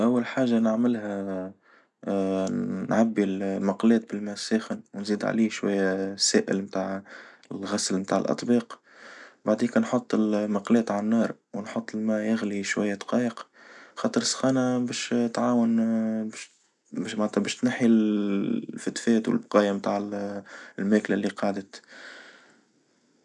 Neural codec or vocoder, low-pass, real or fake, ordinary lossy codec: none; 10.8 kHz; real; none